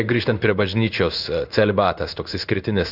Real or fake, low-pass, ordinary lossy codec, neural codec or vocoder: fake; 5.4 kHz; Opus, 64 kbps; codec, 16 kHz in and 24 kHz out, 1 kbps, XY-Tokenizer